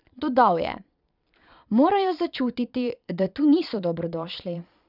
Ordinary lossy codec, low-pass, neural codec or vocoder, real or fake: none; 5.4 kHz; vocoder, 22.05 kHz, 80 mel bands, Vocos; fake